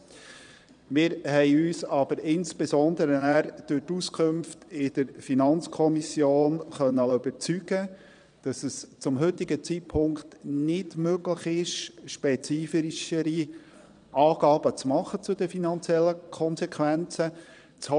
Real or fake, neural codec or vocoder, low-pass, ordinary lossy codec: fake; vocoder, 22.05 kHz, 80 mel bands, Vocos; 9.9 kHz; none